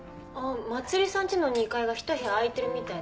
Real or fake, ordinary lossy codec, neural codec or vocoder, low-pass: real; none; none; none